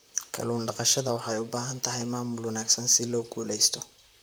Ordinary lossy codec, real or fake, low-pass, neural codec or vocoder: none; fake; none; vocoder, 44.1 kHz, 128 mel bands, Pupu-Vocoder